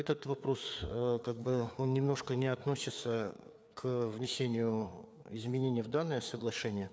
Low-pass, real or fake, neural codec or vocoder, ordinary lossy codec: none; fake; codec, 16 kHz, 4 kbps, FreqCodec, larger model; none